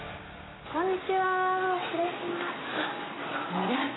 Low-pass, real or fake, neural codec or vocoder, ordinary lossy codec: 7.2 kHz; real; none; AAC, 16 kbps